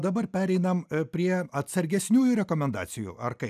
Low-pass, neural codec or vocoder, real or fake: 14.4 kHz; none; real